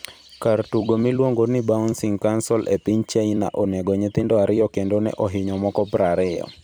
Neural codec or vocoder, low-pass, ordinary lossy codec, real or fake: vocoder, 44.1 kHz, 128 mel bands every 256 samples, BigVGAN v2; none; none; fake